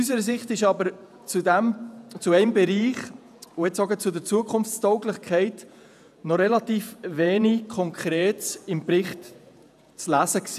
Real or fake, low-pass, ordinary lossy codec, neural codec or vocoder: fake; 14.4 kHz; none; vocoder, 48 kHz, 128 mel bands, Vocos